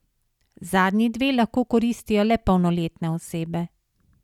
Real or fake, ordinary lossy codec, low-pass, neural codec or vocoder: real; none; 19.8 kHz; none